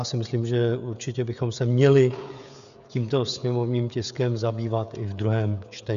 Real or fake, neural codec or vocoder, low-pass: fake; codec, 16 kHz, 16 kbps, FreqCodec, smaller model; 7.2 kHz